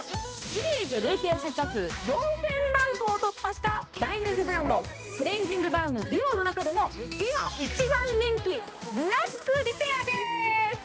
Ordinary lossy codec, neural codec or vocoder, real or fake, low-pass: none; codec, 16 kHz, 1 kbps, X-Codec, HuBERT features, trained on balanced general audio; fake; none